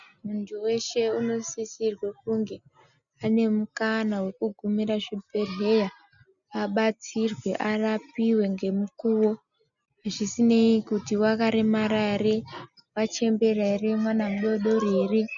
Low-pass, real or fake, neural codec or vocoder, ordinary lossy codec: 7.2 kHz; real; none; MP3, 96 kbps